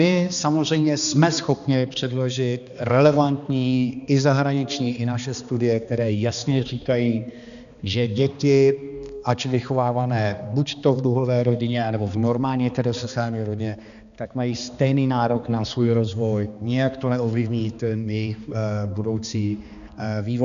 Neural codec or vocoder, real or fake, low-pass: codec, 16 kHz, 2 kbps, X-Codec, HuBERT features, trained on balanced general audio; fake; 7.2 kHz